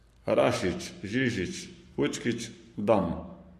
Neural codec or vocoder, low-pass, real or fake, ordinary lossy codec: codec, 44.1 kHz, 7.8 kbps, Pupu-Codec; 14.4 kHz; fake; MP3, 64 kbps